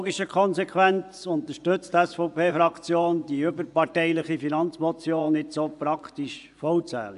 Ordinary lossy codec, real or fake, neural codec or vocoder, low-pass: AAC, 96 kbps; fake; vocoder, 24 kHz, 100 mel bands, Vocos; 10.8 kHz